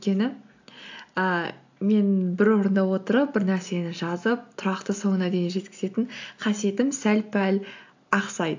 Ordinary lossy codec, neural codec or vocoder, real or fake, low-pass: AAC, 48 kbps; none; real; 7.2 kHz